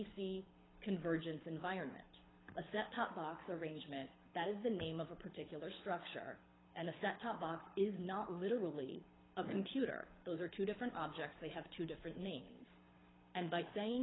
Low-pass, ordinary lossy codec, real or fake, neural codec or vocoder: 7.2 kHz; AAC, 16 kbps; fake; codec, 24 kHz, 6 kbps, HILCodec